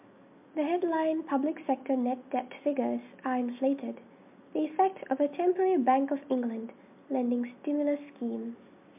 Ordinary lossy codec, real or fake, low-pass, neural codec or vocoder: MP3, 32 kbps; real; 3.6 kHz; none